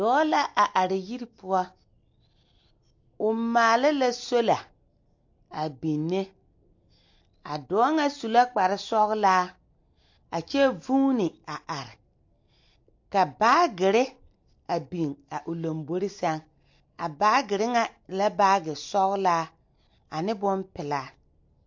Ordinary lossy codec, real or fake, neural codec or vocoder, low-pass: MP3, 48 kbps; real; none; 7.2 kHz